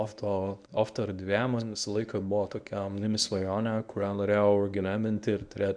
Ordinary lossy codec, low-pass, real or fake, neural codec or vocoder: Opus, 64 kbps; 9.9 kHz; fake; codec, 24 kHz, 0.9 kbps, WavTokenizer, medium speech release version 1